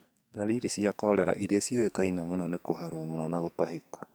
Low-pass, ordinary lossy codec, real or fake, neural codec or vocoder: none; none; fake; codec, 44.1 kHz, 2.6 kbps, SNAC